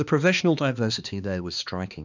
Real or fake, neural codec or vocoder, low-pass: fake; codec, 16 kHz, 2 kbps, X-Codec, HuBERT features, trained on balanced general audio; 7.2 kHz